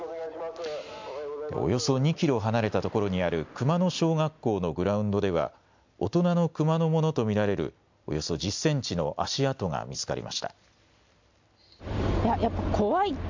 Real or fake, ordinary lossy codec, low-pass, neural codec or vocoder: real; MP3, 64 kbps; 7.2 kHz; none